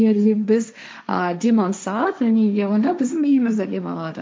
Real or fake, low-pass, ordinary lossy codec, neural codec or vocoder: fake; none; none; codec, 16 kHz, 1.1 kbps, Voila-Tokenizer